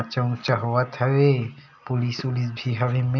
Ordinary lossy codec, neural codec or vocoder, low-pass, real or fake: none; none; 7.2 kHz; real